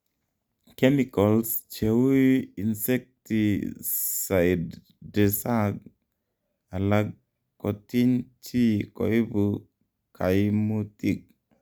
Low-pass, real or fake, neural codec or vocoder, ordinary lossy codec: none; real; none; none